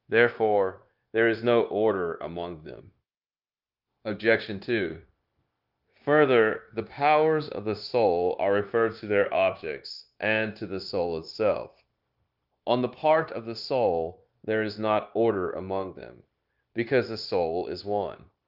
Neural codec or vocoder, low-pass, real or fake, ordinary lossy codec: codec, 16 kHz, 0.9 kbps, LongCat-Audio-Codec; 5.4 kHz; fake; Opus, 24 kbps